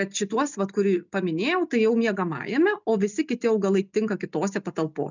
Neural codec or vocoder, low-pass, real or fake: none; 7.2 kHz; real